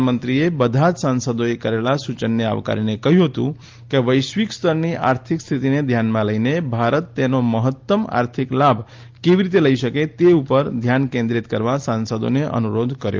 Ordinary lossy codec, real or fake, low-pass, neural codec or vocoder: Opus, 32 kbps; real; 7.2 kHz; none